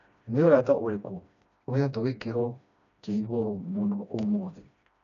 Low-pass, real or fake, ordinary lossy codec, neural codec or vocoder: 7.2 kHz; fake; none; codec, 16 kHz, 1 kbps, FreqCodec, smaller model